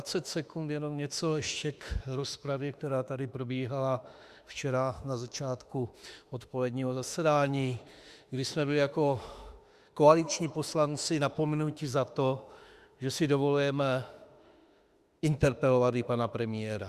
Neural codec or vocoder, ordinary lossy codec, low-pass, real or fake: autoencoder, 48 kHz, 32 numbers a frame, DAC-VAE, trained on Japanese speech; Opus, 64 kbps; 14.4 kHz; fake